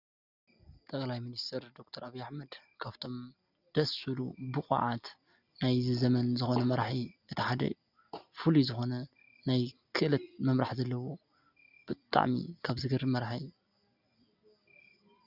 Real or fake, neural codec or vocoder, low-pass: real; none; 5.4 kHz